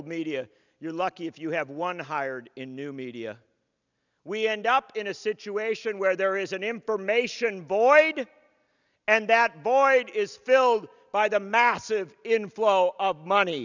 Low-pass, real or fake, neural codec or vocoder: 7.2 kHz; real; none